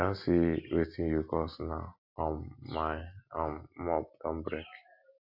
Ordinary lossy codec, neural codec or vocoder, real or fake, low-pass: AAC, 48 kbps; none; real; 5.4 kHz